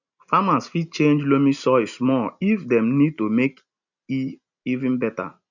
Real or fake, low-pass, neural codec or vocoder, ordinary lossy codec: real; 7.2 kHz; none; none